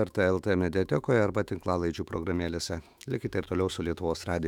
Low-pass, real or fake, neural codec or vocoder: 19.8 kHz; fake; autoencoder, 48 kHz, 128 numbers a frame, DAC-VAE, trained on Japanese speech